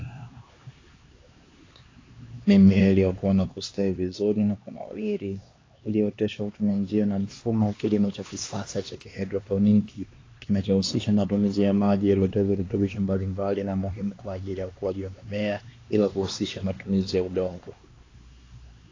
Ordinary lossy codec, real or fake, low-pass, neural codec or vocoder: AAC, 32 kbps; fake; 7.2 kHz; codec, 16 kHz, 2 kbps, X-Codec, HuBERT features, trained on LibriSpeech